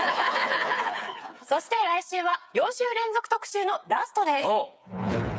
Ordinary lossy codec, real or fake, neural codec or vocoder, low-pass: none; fake; codec, 16 kHz, 4 kbps, FreqCodec, smaller model; none